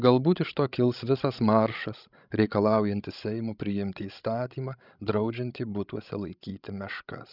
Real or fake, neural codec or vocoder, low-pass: fake; codec, 16 kHz, 16 kbps, FreqCodec, larger model; 5.4 kHz